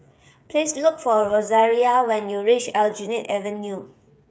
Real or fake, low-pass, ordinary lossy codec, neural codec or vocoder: fake; none; none; codec, 16 kHz, 8 kbps, FreqCodec, smaller model